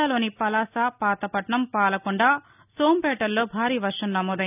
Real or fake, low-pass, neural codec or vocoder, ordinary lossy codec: real; 3.6 kHz; none; none